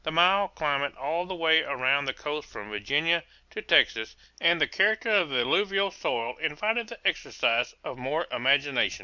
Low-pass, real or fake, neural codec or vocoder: 7.2 kHz; real; none